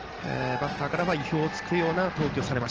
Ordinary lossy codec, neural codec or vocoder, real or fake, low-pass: Opus, 16 kbps; none; real; 7.2 kHz